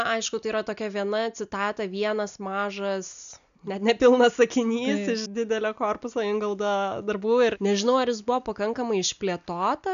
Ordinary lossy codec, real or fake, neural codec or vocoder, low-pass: MP3, 96 kbps; real; none; 7.2 kHz